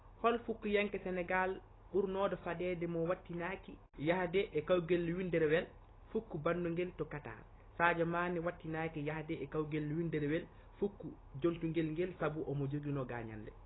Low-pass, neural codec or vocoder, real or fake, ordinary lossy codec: 7.2 kHz; none; real; AAC, 16 kbps